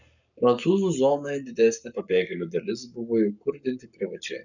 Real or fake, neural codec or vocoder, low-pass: fake; codec, 44.1 kHz, 7.8 kbps, Pupu-Codec; 7.2 kHz